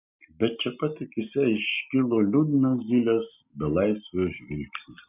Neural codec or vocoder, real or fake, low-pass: none; real; 3.6 kHz